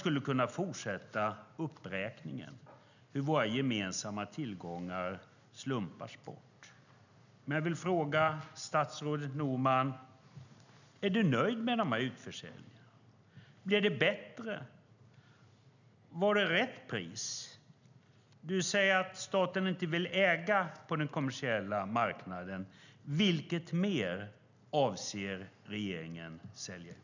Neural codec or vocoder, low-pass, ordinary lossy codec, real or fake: none; 7.2 kHz; none; real